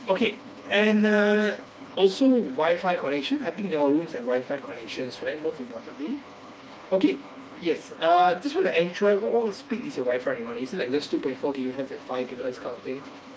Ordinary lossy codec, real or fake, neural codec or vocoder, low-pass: none; fake; codec, 16 kHz, 2 kbps, FreqCodec, smaller model; none